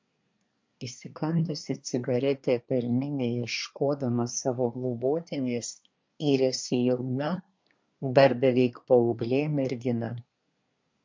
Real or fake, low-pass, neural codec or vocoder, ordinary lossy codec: fake; 7.2 kHz; codec, 24 kHz, 1 kbps, SNAC; MP3, 48 kbps